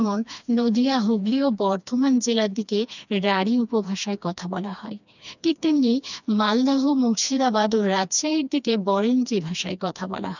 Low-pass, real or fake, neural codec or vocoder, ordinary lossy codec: 7.2 kHz; fake; codec, 16 kHz, 2 kbps, FreqCodec, smaller model; none